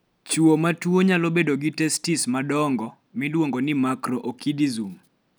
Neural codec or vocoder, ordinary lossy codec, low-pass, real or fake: none; none; none; real